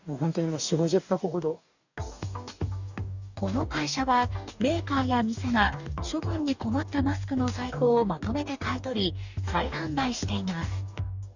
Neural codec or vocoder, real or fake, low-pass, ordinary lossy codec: codec, 44.1 kHz, 2.6 kbps, DAC; fake; 7.2 kHz; none